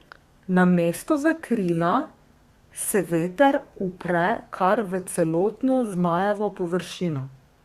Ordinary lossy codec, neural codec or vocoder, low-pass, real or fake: Opus, 64 kbps; codec, 32 kHz, 1.9 kbps, SNAC; 14.4 kHz; fake